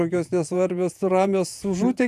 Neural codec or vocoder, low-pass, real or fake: vocoder, 48 kHz, 128 mel bands, Vocos; 14.4 kHz; fake